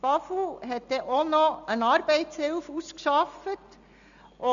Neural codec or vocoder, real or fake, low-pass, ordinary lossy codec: none; real; 7.2 kHz; MP3, 96 kbps